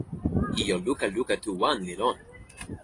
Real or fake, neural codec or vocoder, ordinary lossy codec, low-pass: real; none; AAC, 32 kbps; 10.8 kHz